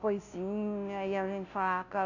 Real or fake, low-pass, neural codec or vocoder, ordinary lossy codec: fake; 7.2 kHz; codec, 16 kHz, 0.5 kbps, FunCodec, trained on Chinese and English, 25 frames a second; none